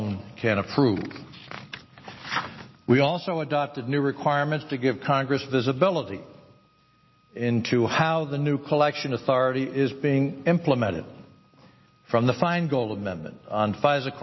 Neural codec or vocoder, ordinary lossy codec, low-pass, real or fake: none; MP3, 24 kbps; 7.2 kHz; real